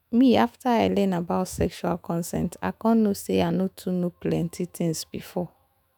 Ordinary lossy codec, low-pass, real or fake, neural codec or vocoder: none; none; fake; autoencoder, 48 kHz, 128 numbers a frame, DAC-VAE, trained on Japanese speech